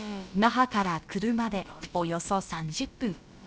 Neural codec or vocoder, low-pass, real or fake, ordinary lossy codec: codec, 16 kHz, about 1 kbps, DyCAST, with the encoder's durations; none; fake; none